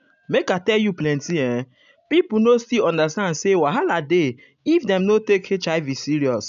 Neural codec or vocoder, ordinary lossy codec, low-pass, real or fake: none; none; 7.2 kHz; real